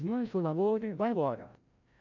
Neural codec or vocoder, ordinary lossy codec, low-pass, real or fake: codec, 16 kHz, 0.5 kbps, FreqCodec, larger model; AAC, 48 kbps; 7.2 kHz; fake